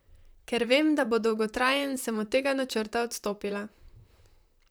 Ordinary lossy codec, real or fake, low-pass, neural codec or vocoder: none; fake; none; vocoder, 44.1 kHz, 128 mel bands, Pupu-Vocoder